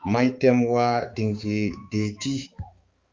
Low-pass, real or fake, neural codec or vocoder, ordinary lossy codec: 7.2 kHz; fake; codec, 16 kHz, 4 kbps, X-Codec, HuBERT features, trained on balanced general audio; Opus, 32 kbps